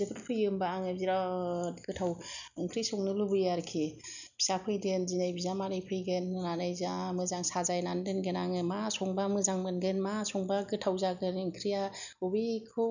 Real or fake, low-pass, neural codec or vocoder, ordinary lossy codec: real; 7.2 kHz; none; none